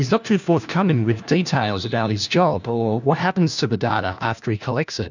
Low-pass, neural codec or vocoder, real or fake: 7.2 kHz; codec, 16 kHz, 1 kbps, FunCodec, trained on LibriTTS, 50 frames a second; fake